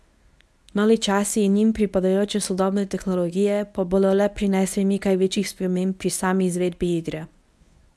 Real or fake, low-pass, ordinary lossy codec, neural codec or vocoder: fake; none; none; codec, 24 kHz, 0.9 kbps, WavTokenizer, medium speech release version 1